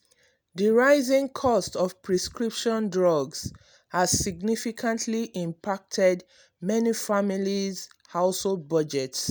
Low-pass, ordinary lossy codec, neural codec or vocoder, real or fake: none; none; none; real